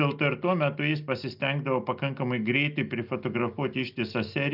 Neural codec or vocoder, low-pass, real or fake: none; 5.4 kHz; real